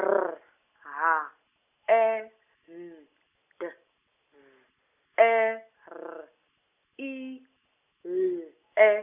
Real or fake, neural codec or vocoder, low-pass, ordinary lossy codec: real; none; 3.6 kHz; none